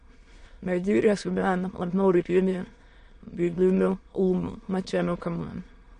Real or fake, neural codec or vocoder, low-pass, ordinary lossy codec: fake; autoencoder, 22.05 kHz, a latent of 192 numbers a frame, VITS, trained on many speakers; 9.9 kHz; MP3, 48 kbps